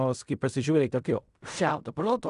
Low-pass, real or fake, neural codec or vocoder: 10.8 kHz; fake; codec, 16 kHz in and 24 kHz out, 0.4 kbps, LongCat-Audio-Codec, fine tuned four codebook decoder